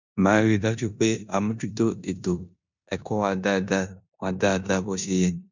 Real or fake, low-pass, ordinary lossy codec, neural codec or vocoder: fake; 7.2 kHz; none; codec, 16 kHz in and 24 kHz out, 0.9 kbps, LongCat-Audio-Codec, four codebook decoder